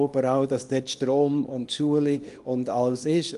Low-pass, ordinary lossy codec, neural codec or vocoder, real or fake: 10.8 kHz; Opus, 32 kbps; codec, 24 kHz, 0.9 kbps, WavTokenizer, small release; fake